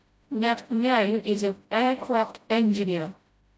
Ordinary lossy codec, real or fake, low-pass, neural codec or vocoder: none; fake; none; codec, 16 kHz, 0.5 kbps, FreqCodec, smaller model